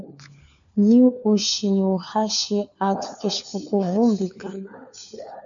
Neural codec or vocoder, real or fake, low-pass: codec, 16 kHz, 4 kbps, FunCodec, trained on LibriTTS, 50 frames a second; fake; 7.2 kHz